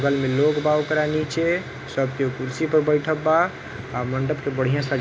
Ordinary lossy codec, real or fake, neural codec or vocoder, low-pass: none; real; none; none